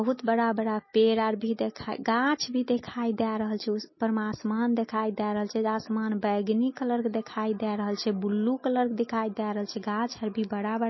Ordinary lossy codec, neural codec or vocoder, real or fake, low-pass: MP3, 24 kbps; none; real; 7.2 kHz